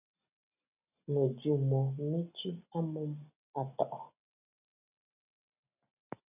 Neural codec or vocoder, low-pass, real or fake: none; 3.6 kHz; real